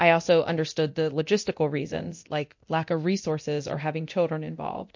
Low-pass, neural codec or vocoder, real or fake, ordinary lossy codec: 7.2 kHz; codec, 24 kHz, 0.9 kbps, DualCodec; fake; MP3, 48 kbps